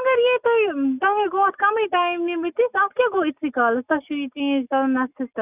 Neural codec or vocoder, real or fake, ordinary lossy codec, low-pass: none; real; none; 3.6 kHz